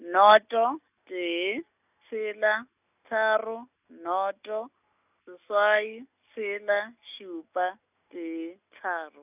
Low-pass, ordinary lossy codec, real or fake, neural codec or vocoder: 3.6 kHz; none; real; none